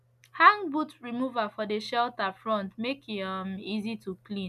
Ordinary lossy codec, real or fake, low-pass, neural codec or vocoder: none; real; 14.4 kHz; none